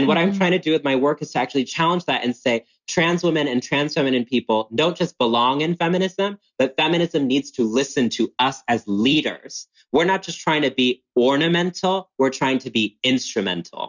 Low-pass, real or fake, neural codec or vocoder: 7.2 kHz; real; none